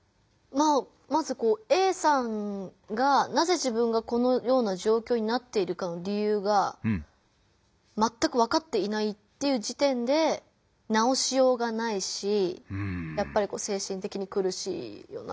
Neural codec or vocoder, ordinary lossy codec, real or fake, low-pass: none; none; real; none